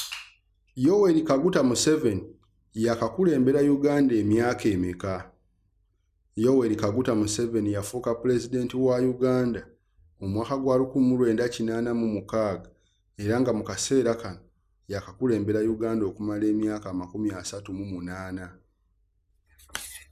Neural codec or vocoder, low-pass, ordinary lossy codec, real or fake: none; 14.4 kHz; none; real